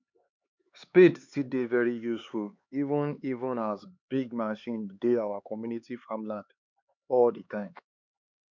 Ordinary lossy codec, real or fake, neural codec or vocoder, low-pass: MP3, 64 kbps; fake; codec, 16 kHz, 4 kbps, X-Codec, HuBERT features, trained on LibriSpeech; 7.2 kHz